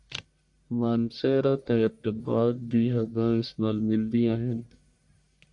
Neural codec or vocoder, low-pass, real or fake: codec, 44.1 kHz, 1.7 kbps, Pupu-Codec; 10.8 kHz; fake